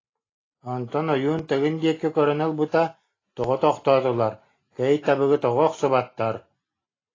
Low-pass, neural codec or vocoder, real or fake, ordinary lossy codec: 7.2 kHz; none; real; AAC, 32 kbps